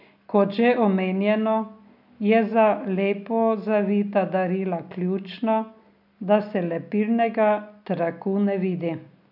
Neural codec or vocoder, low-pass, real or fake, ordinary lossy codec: none; 5.4 kHz; real; none